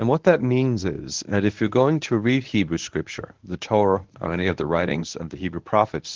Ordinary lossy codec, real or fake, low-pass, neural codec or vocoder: Opus, 16 kbps; fake; 7.2 kHz; codec, 24 kHz, 0.9 kbps, WavTokenizer, medium speech release version 1